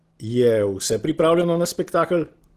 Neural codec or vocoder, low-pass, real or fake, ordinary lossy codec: none; 14.4 kHz; real; Opus, 16 kbps